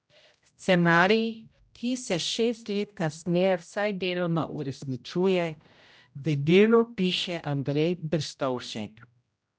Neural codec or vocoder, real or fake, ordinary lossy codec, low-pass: codec, 16 kHz, 0.5 kbps, X-Codec, HuBERT features, trained on general audio; fake; none; none